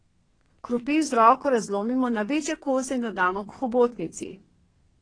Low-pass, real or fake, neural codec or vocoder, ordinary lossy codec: 9.9 kHz; fake; codec, 32 kHz, 1.9 kbps, SNAC; AAC, 32 kbps